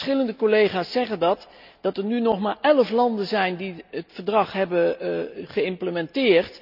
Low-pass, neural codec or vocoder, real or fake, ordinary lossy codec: 5.4 kHz; none; real; none